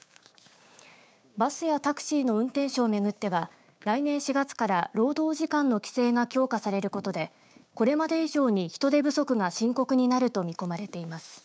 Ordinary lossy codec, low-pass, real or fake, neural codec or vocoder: none; none; fake; codec, 16 kHz, 6 kbps, DAC